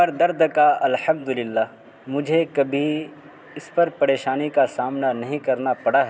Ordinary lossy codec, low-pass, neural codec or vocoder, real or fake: none; none; none; real